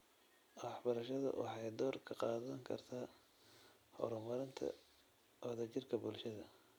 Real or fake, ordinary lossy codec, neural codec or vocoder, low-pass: fake; none; vocoder, 44.1 kHz, 128 mel bands every 256 samples, BigVGAN v2; none